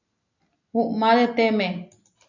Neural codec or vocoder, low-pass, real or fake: none; 7.2 kHz; real